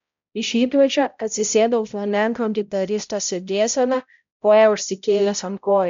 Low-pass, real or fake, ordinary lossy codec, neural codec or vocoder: 7.2 kHz; fake; MP3, 64 kbps; codec, 16 kHz, 0.5 kbps, X-Codec, HuBERT features, trained on balanced general audio